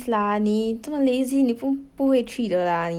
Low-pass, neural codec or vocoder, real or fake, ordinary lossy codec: 19.8 kHz; none; real; Opus, 24 kbps